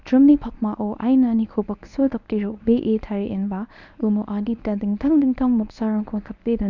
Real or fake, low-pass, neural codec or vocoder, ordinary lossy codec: fake; 7.2 kHz; codec, 24 kHz, 0.9 kbps, WavTokenizer, medium speech release version 1; none